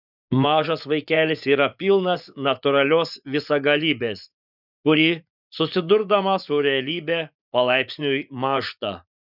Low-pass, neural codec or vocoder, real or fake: 5.4 kHz; vocoder, 24 kHz, 100 mel bands, Vocos; fake